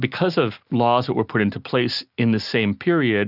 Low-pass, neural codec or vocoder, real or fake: 5.4 kHz; none; real